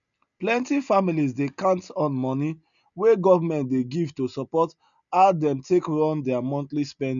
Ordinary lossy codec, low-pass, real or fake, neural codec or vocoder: none; 7.2 kHz; real; none